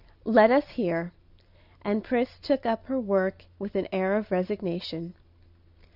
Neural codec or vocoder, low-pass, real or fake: none; 5.4 kHz; real